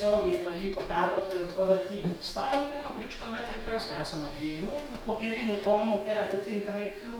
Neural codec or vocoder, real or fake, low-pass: codec, 44.1 kHz, 2.6 kbps, DAC; fake; 19.8 kHz